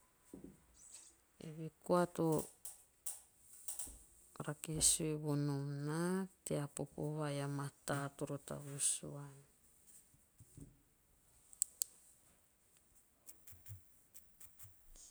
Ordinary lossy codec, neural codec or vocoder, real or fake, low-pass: none; none; real; none